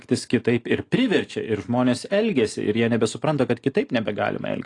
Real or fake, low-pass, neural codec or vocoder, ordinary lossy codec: real; 10.8 kHz; none; AAC, 48 kbps